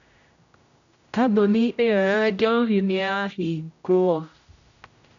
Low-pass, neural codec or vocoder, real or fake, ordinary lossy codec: 7.2 kHz; codec, 16 kHz, 0.5 kbps, X-Codec, HuBERT features, trained on general audio; fake; none